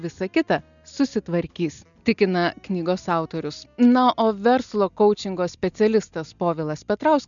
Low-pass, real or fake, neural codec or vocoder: 7.2 kHz; real; none